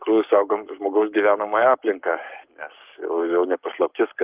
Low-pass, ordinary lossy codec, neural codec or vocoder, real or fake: 3.6 kHz; Opus, 32 kbps; codec, 44.1 kHz, 7.8 kbps, Pupu-Codec; fake